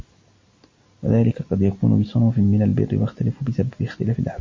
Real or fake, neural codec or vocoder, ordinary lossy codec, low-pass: real; none; MP3, 32 kbps; 7.2 kHz